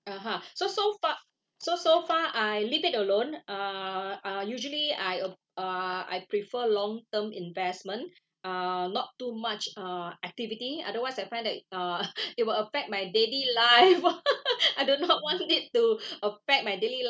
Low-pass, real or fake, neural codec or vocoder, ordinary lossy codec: none; real; none; none